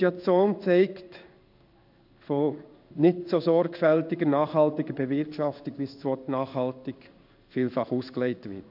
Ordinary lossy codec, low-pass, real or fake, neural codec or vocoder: AAC, 48 kbps; 5.4 kHz; fake; codec, 16 kHz in and 24 kHz out, 1 kbps, XY-Tokenizer